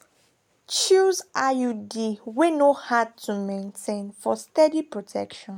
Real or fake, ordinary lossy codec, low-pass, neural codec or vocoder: real; MP3, 96 kbps; 19.8 kHz; none